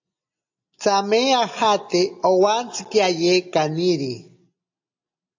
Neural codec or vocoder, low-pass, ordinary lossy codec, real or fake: none; 7.2 kHz; AAC, 48 kbps; real